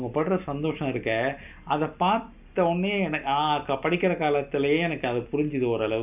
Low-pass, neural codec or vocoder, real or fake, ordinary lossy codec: 3.6 kHz; none; real; none